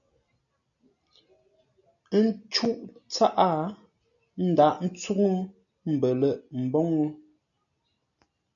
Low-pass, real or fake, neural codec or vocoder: 7.2 kHz; real; none